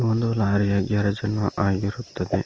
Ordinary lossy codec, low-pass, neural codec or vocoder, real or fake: Opus, 24 kbps; 7.2 kHz; none; real